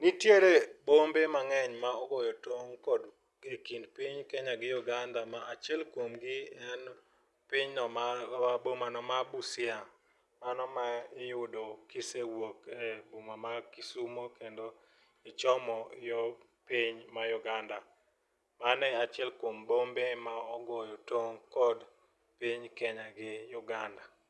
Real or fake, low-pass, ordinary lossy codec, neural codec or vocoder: real; none; none; none